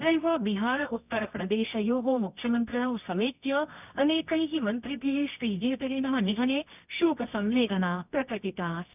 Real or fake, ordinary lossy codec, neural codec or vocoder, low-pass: fake; none; codec, 24 kHz, 0.9 kbps, WavTokenizer, medium music audio release; 3.6 kHz